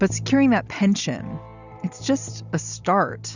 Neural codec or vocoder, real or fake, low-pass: none; real; 7.2 kHz